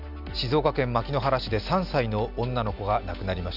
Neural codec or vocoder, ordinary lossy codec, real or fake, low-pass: none; none; real; 5.4 kHz